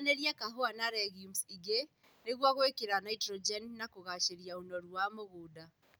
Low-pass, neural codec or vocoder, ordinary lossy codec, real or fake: none; none; none; real